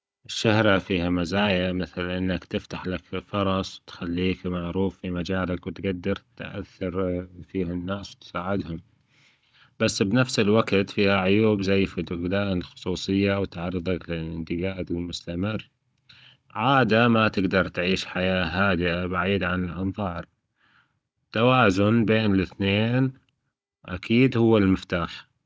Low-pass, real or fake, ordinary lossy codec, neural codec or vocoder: none; fake; none; codec, 16 kHz, 16 kbps, FunCodec, trained on Chinese and English, 50 frames a second